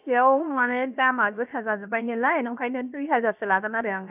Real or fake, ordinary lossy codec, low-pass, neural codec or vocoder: fake; none; 3.6 kHz; codec, 16 kHz, 0.7 kbps, FocalCodec